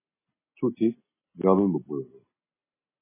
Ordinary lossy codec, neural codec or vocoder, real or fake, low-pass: MP3, 16 kbps; none; real; 3.6 kHz